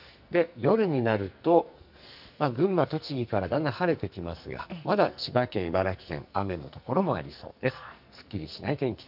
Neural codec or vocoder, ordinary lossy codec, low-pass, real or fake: codec, 44.1 kHz, 2.6 kbps, SNAC; none; 5.4 kHz; fake